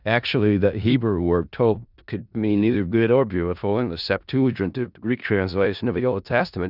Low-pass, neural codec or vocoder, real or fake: 5.4 kHz; codec, 16 kHz in and 24 kHz out, 0.4 kbps, LongCat-Audio-Codec, four codebook decoder; fake